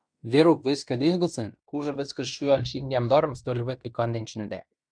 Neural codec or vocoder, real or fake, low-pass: codec, 16 kHz in and 24 kHz out, 0.9 kbps, LongCat-Audio-Codec, fine tuned four codebook decoder; fake; 9.9 kHz